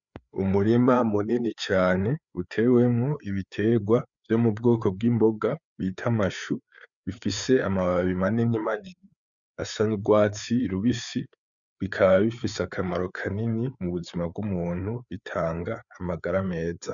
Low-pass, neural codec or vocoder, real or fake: 7.2 kHz; codec, 16 kHz, 4 kbps, FreqCodec, larger model; fake